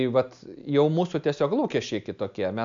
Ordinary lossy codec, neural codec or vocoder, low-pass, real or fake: MP3, 64 kbps; none; 7.2 kHz; real